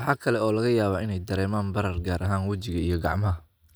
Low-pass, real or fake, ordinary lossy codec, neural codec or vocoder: none; real; none; none